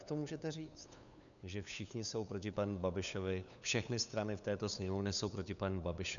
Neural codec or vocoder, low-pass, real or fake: codec, 16 kHz, 8 kbps, FunCodec, trained on LibriTTS, 25 frames a second; 7.2 kHz; fake